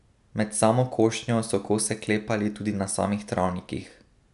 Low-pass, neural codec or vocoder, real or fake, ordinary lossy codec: 10.8 kHz; none; real; none